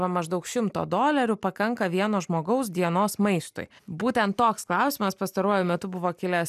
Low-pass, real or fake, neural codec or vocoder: 14.4 kHz; real; none